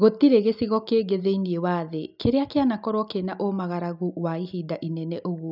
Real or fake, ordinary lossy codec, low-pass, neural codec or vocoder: real; none; 5.4 kHz; none